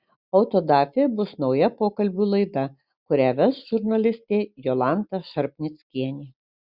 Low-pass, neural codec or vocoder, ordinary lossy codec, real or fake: 5.4 kHz; none; Opus, 64 kbps; real